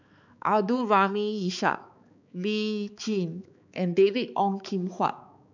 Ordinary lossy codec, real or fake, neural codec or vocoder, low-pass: none; fake; codec, 16 kHz, 4 kbps, X-Codec, HuBERT features, trained on balanced general audio; 7.2 kHz